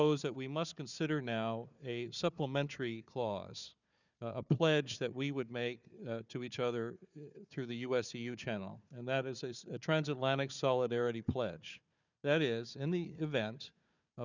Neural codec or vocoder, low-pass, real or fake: codec, 16 kHz, 4 kbps, FunCodec, trained on Chinese and English, 50 frames a second; 7.2 kHz; fake